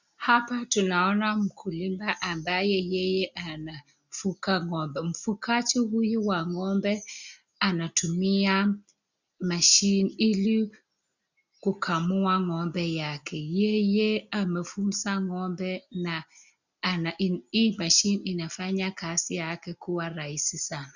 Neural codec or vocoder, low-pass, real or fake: none; 7.2 kHz; real